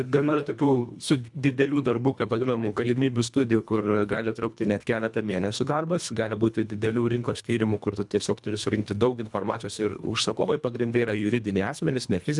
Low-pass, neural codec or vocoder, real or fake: 10.8 kHz; codec, 24 kHz, 1.5 kbps, HILCodec; fake